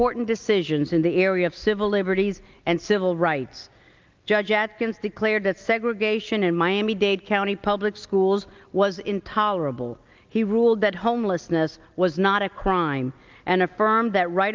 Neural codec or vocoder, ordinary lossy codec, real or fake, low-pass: none; Opus, 24 kbps; real; 7.2 kHz